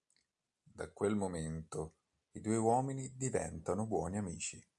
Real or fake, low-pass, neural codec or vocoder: real; 10.8 kHz; none